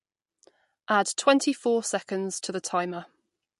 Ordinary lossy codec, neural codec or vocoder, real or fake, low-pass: MP3, 48 kbps; none; real; 14.4 kHz